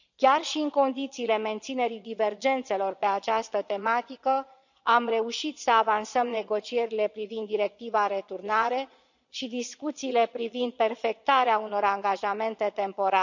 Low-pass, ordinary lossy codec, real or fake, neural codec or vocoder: 7.2 kHz; none; fake; vocoder, 22.05 kHz, 80 mel bands, Vocos